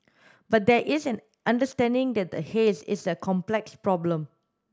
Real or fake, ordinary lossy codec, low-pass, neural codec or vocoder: real; none; none; none